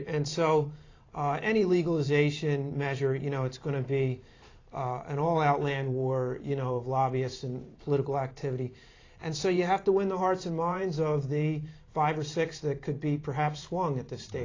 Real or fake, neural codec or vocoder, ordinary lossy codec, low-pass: fake; vocoder, 44.1 kHz, 128 mel bands every 512 samples, BigVGAN v2; AAC, 32 kbps; 7.2 kHz